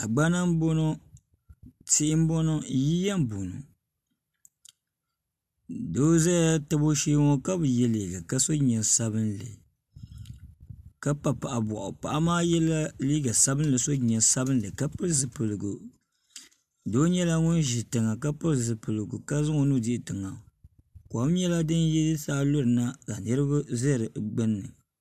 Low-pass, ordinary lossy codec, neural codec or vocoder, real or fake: 14.4 kHz; AAC, 96 kbps; none; real